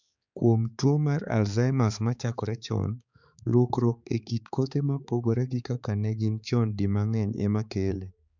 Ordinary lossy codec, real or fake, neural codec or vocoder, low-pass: none; fake; codec, 16 kHz, 4 kbps, X-Codec, HuBERT features, trained on balanced general audio; 7.2 kHz